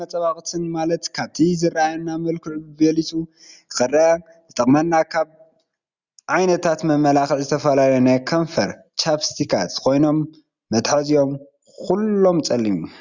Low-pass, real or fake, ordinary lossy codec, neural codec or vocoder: 7.2 kHz; real; Opus, 64 kbps; none